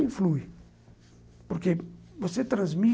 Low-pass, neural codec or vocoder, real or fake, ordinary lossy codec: none; none; real; none